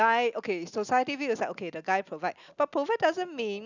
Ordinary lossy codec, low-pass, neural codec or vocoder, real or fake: none; 7.2 kHz; none; real